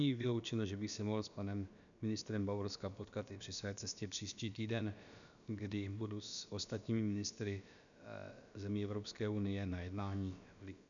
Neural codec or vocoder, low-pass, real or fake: codec, 16 kHz, about 1 kbps, DyCAST, with the encoder's durations; 7.2 kHz; fake